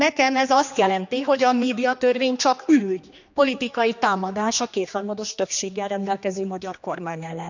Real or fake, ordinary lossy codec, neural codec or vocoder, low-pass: fake; none; codec, 16 kHz, 2 kbps, X-Codec, HuBERT features, trained on general audio; 7.2 kHz